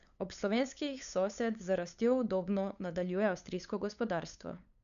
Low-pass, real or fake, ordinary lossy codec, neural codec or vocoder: 7.2 kHz; fake; none; codec, 16 kHz, 8 kbps, FunCodec, trained on LibriTTS, 25 frames a second